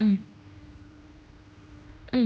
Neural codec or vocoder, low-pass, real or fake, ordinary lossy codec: codec, 16 kHz, 1 kbps, X-Codec, HuBERT features, trained on general audio; none; fake; none